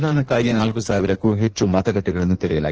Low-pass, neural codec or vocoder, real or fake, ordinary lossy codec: 7.2 kHz; codec, 16 kHz in and 24 kHz out, 1.1 kbps, FireRedTTS-2 codec; fake; Opus, 16 kbps